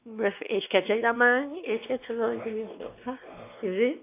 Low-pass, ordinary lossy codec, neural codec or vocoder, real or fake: 3.6 kHz; AAC, 24 kbps; codec, 16 kHz, 2 kbps, X-Codec, WavLM features, trained on Multilingual LibriSpeech; fake